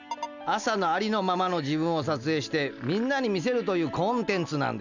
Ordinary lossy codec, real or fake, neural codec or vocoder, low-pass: none; real; none; 7.2 kHz